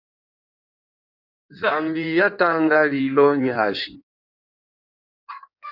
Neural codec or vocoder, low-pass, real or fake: codec, 16 kHz in and 24 kHz out, 1.1 kbps, FireRedTTS-2 codec; 5.4 kHz; fake